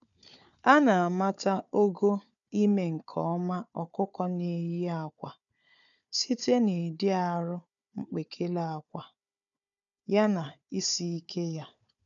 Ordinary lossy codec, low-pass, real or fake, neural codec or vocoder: none; 7.2 kHz; fake; codec, 16 kHz, 4 kbps, FunCodec, trained on Chinese and English, 50 frames a second